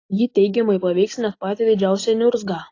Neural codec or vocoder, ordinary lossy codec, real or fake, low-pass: none; AAC, 32 kbps; real; 7.2 kHz